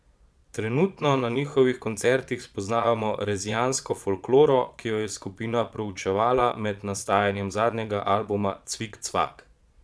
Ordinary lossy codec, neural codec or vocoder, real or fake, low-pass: none; vocoder, 22.05 kHz, 80 mel bands, Vocos; fake; none